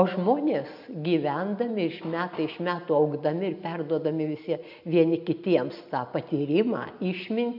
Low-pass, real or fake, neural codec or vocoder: 5.4 kHz; real; none